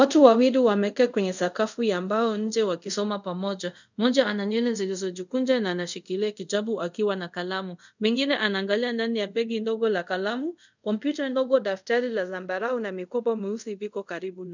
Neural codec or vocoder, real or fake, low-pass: codec, 24 kHz, 0.5 kbps, DualCodec; fake; 7.2 kHz